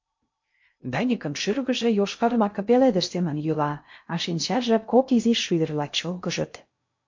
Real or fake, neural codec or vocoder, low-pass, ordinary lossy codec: fake; codec, 16 kHz in and 24 kHz out, 0.6 kbps, FocalCodec, streaming, 2048 codes; 7.2 kHz; MP3, 48 kbps